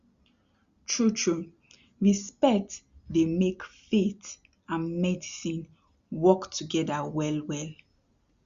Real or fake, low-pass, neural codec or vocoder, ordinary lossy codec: real; 7.2 kHz; none; Opus, 64 kbps